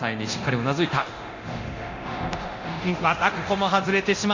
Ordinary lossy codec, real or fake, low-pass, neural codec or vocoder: Opus, 64 kbps; fake; 7.2 kHz; codec, 24 kHz, 0.9 kbps, DualCodec